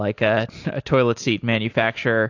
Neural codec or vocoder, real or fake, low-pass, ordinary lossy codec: none; real; 7.2 kHz; AAC, 48 kbps